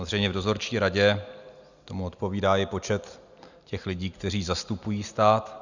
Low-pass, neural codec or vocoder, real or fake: 7.2 kHz; none; real